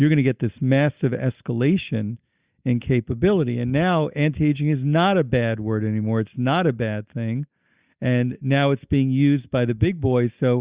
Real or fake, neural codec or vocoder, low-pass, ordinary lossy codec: real; none; 3.6 kHz; Opus, 64 kbps